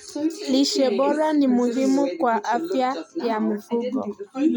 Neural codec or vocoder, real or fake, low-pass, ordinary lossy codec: none; real; 19.8 kHz; none